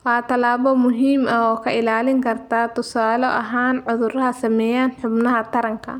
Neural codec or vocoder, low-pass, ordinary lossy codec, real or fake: none; 19.8 kHz; none; real